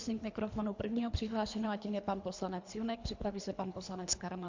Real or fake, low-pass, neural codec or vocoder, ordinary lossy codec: fake; 7.2 kHz; codec, 24 kHz, 3 kbps, HILCodec; MP3, 64 kbps